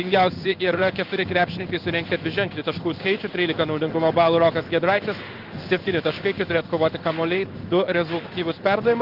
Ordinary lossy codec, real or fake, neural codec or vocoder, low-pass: Opus, 32 kbps; fake; codec, 16 kHz in and 24 kHz out, 1 kbps, XY-Tokenizer; 5.4 kHz